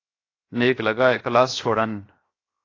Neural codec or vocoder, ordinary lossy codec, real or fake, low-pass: codec, 16 kHz, 0.7 kbps, FocalCodec; AAC, 32 kbps; fake; 7.2 kHz